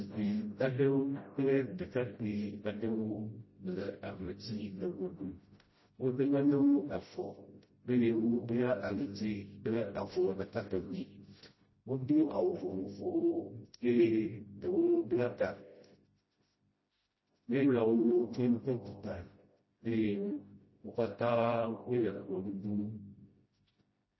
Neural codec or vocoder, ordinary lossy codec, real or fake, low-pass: codec, 16 kHz, 0.5 kbps, FreqCodec, smaller model; MP3, 24 kbps; fake; 7.2 kHz